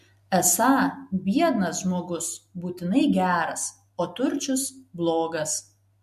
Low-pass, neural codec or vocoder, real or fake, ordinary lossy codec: 14.4 kHz; none; real; MP3, 64 kbps